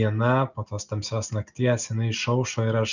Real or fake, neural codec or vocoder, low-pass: real; none; 7.2 kHz